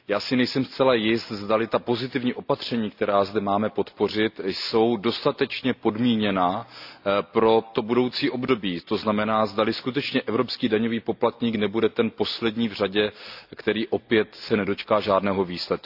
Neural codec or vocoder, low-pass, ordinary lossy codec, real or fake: none; 5.4 kHz; AAC, 48 kbps; real